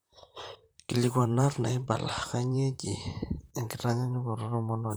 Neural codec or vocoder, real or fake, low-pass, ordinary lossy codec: vocoder, 44.1 kHz, 128 mel bands, Pupu-Vocoder; fake; none; none